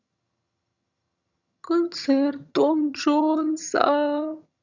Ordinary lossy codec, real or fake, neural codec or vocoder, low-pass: none; fake; vocoder, 22.05 kHz, 80 mel bands, HiFi-GAN; 7.2 kHz